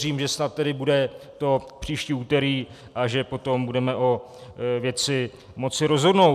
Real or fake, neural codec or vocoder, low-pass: real; none; 14.4 kHz